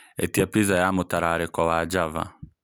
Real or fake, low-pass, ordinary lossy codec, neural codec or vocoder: real; none; none; none